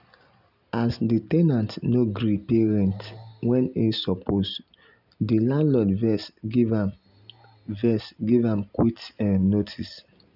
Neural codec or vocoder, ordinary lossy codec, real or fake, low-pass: none; none; real; 5.4 kHz